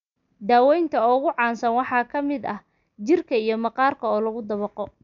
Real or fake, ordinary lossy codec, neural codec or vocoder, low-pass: real; none; none; 7.2 kHz